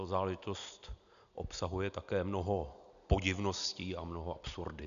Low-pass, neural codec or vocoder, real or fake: 7.2 kHz; none; real